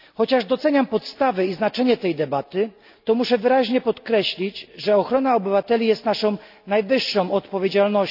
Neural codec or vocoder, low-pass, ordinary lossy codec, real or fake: none; 5.4 kHz; none; real